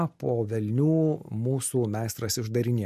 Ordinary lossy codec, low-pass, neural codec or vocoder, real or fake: MP3, 64 kbps; 14.4 kHz; none; real